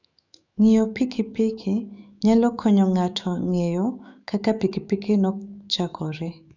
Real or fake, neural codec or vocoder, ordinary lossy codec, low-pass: fake; autoencoder, 48 kHz, 128 numbers a frame, DAC-VAE, trained on Japanese speech; none; 7.2 kHz